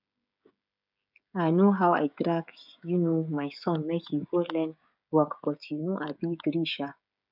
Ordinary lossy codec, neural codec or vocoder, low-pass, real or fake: none; codec, 16 kHz, 16 kbps, FreqCodec, smaller model; 5.4 kHz; fake